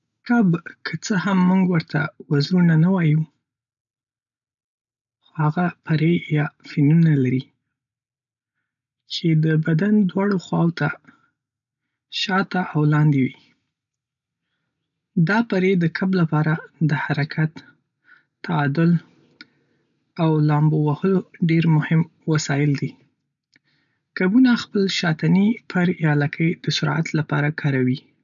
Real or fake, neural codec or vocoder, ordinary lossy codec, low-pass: real; none; none; 7.2 kHz